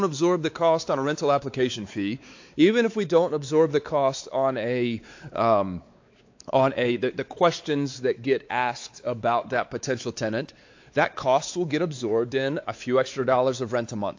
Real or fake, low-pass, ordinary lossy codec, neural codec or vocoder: fake; 7.2 kHz; AAC, 48 kbps; codec, 16 kHz, 4 kbps, X-Codec, WavLM features, trained on Multilingual LibriSpeech